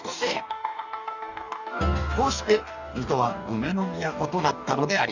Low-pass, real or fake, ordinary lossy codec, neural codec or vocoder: 7.2 kHz; fake; none; codec, 44.1 kHz, 2.6 kbps, DAC